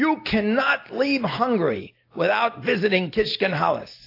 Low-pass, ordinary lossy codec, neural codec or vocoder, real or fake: 5.4 kHz; AAC, 32 kbps; none; real